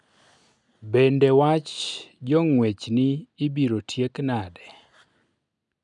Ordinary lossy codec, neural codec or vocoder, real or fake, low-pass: none; none; real; 10.8 kHz